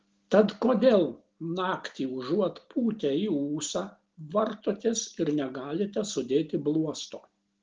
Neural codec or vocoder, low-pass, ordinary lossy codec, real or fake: none; 7.2 kHz; Opus, 16 kbps; real